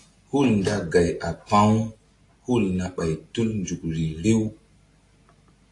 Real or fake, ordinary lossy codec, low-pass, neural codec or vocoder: real; AAC, 48 kbps; 10.8 kHz; none